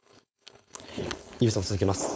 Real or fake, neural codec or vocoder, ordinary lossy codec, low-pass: fake; codec, 16 kHz, 4.8 kbps, FACodec; none; none